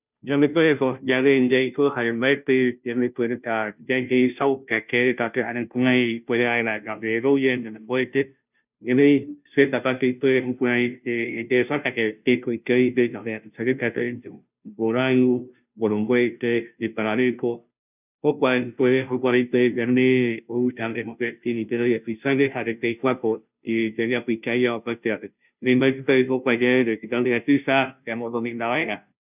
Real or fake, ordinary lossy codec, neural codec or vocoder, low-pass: fake; none; codec, 16 kHz, 0.5 kbps, FunCodec, trained on Chinese and English, 25 frames a second; 3.6 kHz